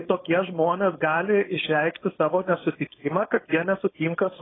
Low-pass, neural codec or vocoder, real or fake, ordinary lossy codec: 7.2 kHz; codec, 16 kHz, 4.8 kbps, FACodec; fake; AAC, 16 kbps